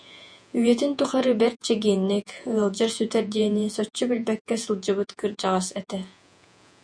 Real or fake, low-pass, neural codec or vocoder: fake; 9.9 kHz; vocoder, 48 kHz, 128 mel bands, Vocos